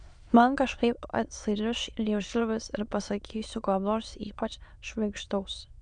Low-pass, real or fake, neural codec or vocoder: 9.9 kHz; fake; autoencoder, 22.05 kHz, a latent of 192 numbers a frame, VITS, trained on many speakers